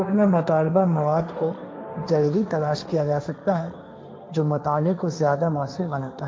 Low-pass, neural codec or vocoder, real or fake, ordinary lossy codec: none; codec, 16 kHz, 1.1 kbps, Voila-Tokenizer; fake; none